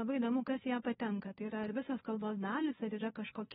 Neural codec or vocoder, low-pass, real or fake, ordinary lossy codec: codec, 16 kHz, 2 kbps, FunCodec, trained on Chinese and English, 25 frames a second; 7.2 kHz; fake; AAC, 16 kbps